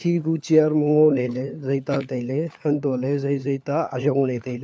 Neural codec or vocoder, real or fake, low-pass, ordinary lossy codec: codec, 16 kHz, 4 kbps, FunCodec, trained on LibriTTS, 50 frames a second; fake; none; none